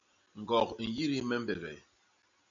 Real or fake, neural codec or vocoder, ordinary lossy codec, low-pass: real; none; AAC, 64 kbps; 7.2 kHz